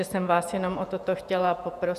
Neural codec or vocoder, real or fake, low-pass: vocoder, 48 kHz, 128 mel bands, Vocos; fake; 14.4 kHz